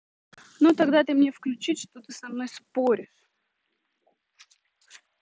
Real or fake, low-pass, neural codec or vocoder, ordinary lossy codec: real; none; none; none